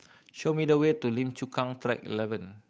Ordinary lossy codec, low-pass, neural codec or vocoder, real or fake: none; none; codec, 16 kHz, 8 kbps, FunCodec, trained on Chinese and English, 25 frames a second; fake